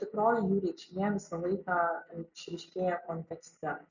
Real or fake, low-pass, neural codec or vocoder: real; 7.2 kHz; none